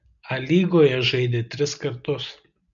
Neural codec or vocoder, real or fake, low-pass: none; real; 7.2 kHz